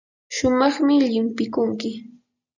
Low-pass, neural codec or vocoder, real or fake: 7.2 kHz; none; real